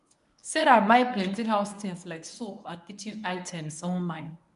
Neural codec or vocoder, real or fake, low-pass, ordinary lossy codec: codec, 24 kHz, 0.9 kbps, WavTokenizer, medium speech release version 1; fake; 10.8 kHz; none